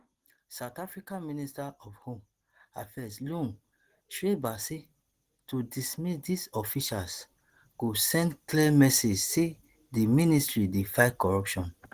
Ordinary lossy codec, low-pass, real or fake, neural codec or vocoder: Opus, 16 kbps; 14.4 kHz; real; none